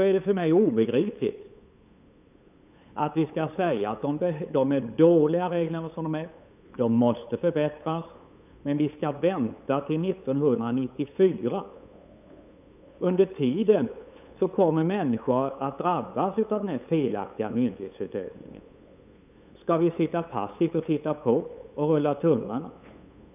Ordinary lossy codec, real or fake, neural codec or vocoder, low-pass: none; fake; codec, 16 kHz, 8 kbps, FunCodec, trained on LibriTTS, 25 frames a second; 3.6 kHz